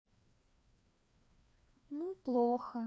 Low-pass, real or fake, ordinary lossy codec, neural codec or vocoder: none; fake; none; codec, 16 kHz, 2 kbps, FreqCodec, larger model